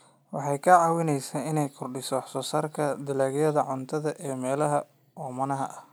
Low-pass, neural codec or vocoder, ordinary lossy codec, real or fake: none; none; none; real